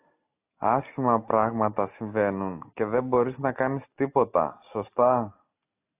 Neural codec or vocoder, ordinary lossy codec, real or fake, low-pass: none; AAC, 32 kbps; real; 3.6 kHz